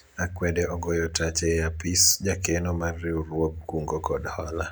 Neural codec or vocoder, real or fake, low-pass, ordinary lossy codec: none; real; none; none